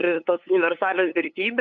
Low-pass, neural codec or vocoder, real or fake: 7.2 kHz; codec, 16 kHz, 8 kbps, FunCodec, trained on LibriTTS, 25 frames a second; fake